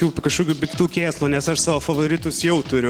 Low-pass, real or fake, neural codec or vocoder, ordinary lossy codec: 19.8 kHz; real; none; Opus, 16 kbps